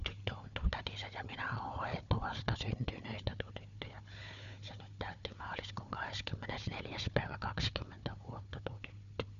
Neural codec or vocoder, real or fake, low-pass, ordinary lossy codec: codec, 16 kHz, 4 kbps, FunCodec, trained on Chinese and English, 50 frames a second; fake; 7.2 kHz; none